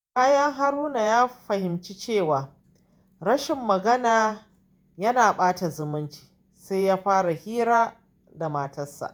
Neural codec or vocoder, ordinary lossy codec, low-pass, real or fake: vocoder, 48 kHz, 128 mel bands, Vocos; none; none; fake